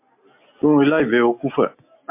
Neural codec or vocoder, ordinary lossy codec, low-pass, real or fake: none; MP3, 32 kbps; 3.6 kHz; real